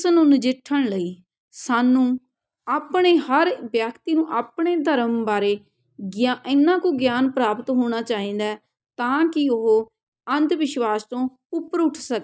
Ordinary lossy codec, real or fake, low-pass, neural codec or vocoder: none; real; none; none